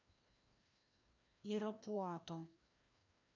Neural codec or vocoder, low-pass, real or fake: codec, 16 kHz, 1 kbps, FreqCodec, larger model; 7.2 kHz; fake